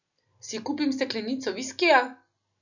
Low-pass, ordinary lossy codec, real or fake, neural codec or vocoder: 7.2 kHz; none; real; none